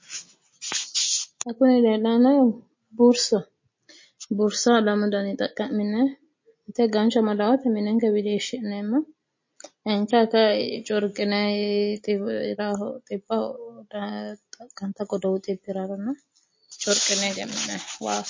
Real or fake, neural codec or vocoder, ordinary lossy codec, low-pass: real; none; MP3, 32 kbps; 7.2 kHz